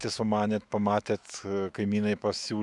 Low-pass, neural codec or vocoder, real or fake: 10.8 kHz; autoencoder, 48 kHz, 128 numbers a frame, DAC-VAE, trained on Japanese speech; fake